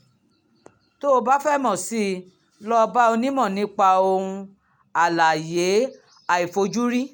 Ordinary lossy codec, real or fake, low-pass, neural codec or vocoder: none; real; none; none